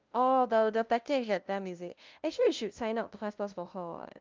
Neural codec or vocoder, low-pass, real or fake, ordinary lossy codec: codec, 16 kHz, 0.5 kbps, FunCodec, trained on LibriTTS, 25 frames a second; 7.2 kHz; fake; Opus, 24 kbps